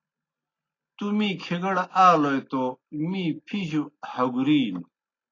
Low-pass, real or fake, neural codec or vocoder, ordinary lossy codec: 7.2 kHz; real; none; AAC, 32 kbps